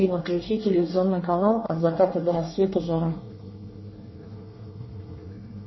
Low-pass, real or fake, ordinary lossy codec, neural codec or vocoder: 7.2 kHz; fake; MP3, 24 kbps; codec, 24 kHz, 1 kbps, SNAC